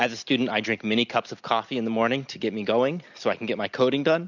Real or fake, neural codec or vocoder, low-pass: real; none; 7.2 kHz